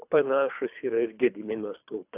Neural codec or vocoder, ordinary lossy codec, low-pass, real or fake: codec, 24 kHz, 3 kbps, HILCodec; AAC, 32 kbps; 3.6 kHz; fake